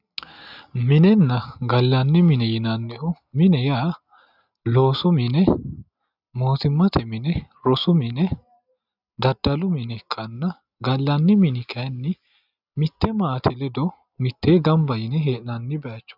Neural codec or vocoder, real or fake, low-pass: none; real; 5.4 kHz